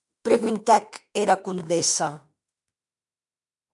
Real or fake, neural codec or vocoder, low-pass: fake; autoencoder, 48 kHz, 32 numbers a frame, DAC-VAE, trained on Japanese speech; 10.8 kHz